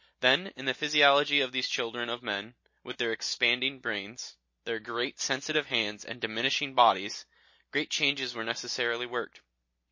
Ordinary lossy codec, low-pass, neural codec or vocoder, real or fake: MP3, 32 kbps; 7.2 kHz; none; real